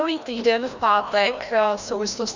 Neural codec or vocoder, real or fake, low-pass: codec, 16 kHz, 1 kbps, FreqCodec, larger model; fake; 7.2 kHz